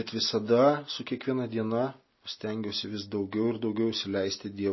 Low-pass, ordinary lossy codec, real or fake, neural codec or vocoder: 7.2 kHz; MP3, 24 kbps; real; none